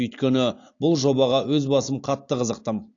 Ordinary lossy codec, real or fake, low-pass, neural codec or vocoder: AAC, 48 kbps; real; 7.2 kHz; none